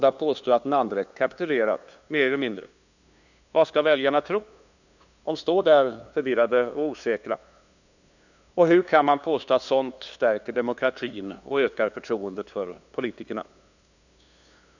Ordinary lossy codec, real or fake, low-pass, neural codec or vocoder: none; fake; 7.2 kHz; codec, 16 kHz, 2 kbps, FunCodec, trained on LibriTTS, 25 frames a second